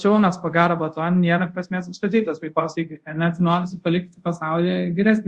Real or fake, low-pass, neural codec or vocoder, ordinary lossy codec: fake; 10.8 kHz; codec, 24 kHz, 0.5 kbps, DualCodec; Opus, 64 kbps